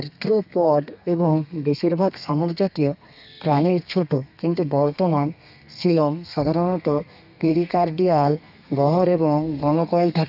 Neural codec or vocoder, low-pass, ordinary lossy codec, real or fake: codec, 32 kHz, 1.9 kbps, SNAC; 5.4 kHz; none; fake